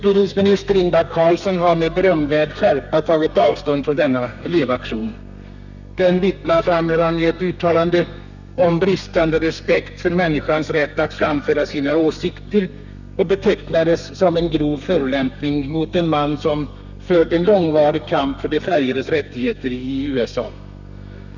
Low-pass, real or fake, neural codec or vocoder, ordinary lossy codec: 7.2 kHz; fake; codec, 32 kHz, 1.9 kbps, SNAC; none